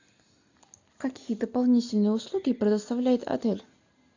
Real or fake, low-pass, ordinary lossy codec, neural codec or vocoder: real; 7.2 kHz; AAC, 48 kbps; none